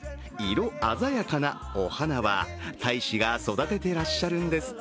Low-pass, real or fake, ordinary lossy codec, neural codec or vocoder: none; real; none; none